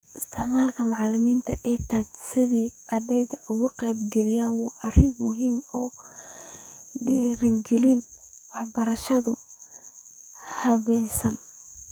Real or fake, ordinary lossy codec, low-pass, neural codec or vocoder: fake; none; none; codec, 44.1 kHz, 2.6 kbps, SNAC